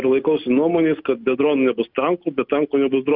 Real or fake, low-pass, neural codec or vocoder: real; 5.4 kHz; none